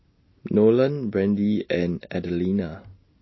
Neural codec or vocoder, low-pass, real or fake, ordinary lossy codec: none; 7.2 kHz; real; MP3, 24 kbps